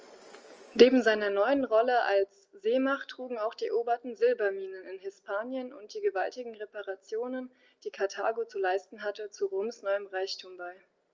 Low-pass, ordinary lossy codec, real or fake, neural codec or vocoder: 7.2 kHz; Opus, 24 kbps; real; none